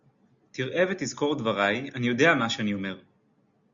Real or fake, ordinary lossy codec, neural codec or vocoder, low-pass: real; Opus, 64 kbps; none; 7.2 kHz